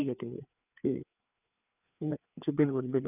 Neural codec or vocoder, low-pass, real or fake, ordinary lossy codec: codec, 16 kHz, 4 kbps, FreqCodec, larger model; 3.6 kHz; fake; none